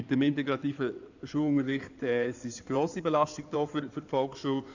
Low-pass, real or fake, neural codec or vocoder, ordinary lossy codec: 7.2 kHz; fake; codec, 16 kHz in and 24 kHz out, 2.2 kbps, FireRedTTS-2 codec; none